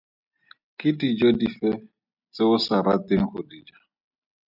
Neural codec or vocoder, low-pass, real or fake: none; 5.4 kHz; real